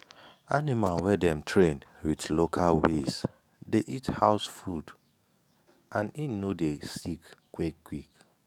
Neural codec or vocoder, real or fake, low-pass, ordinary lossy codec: codec, 44.1 kHz, 7.8 kbps, DAC; fake; 19.8 kHz; none